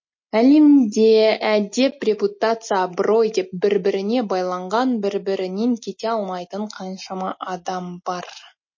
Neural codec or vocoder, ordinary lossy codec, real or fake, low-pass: none; MP3, 32 kbps; real; 7.2 kHz